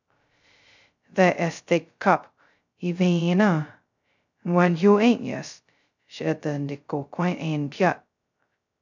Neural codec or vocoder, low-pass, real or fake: codec, 16 kHz, 0.2 kbps, FocalCodec; 7.2 kHz; fake